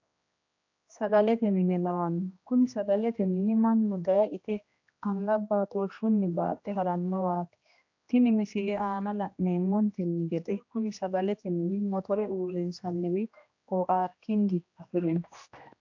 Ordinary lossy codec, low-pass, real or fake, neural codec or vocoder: AAC, 48 kbps; 7.2 kHz; fake; codec, 16 kHz, 1 kbps, X-Codec, HuBERT features, trained on general audio